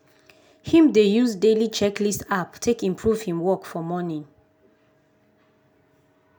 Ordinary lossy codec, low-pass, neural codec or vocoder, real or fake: none; none; none; real